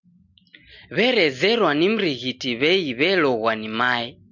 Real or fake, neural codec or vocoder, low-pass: real; none; 7.2 kHz